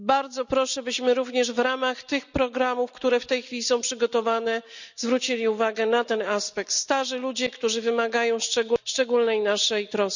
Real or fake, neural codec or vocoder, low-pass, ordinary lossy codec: real; none; 7.2 kHz; none